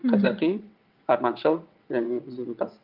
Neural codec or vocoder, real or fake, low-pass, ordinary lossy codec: vocoder, 22.05 kHz, 80 mel bands, WaveNeXt; fake; 5.4 kHz; Opus, 32 kbps